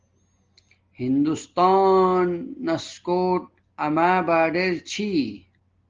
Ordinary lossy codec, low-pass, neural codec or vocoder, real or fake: Opus, 16 kbps; 7.2 kHz; none; real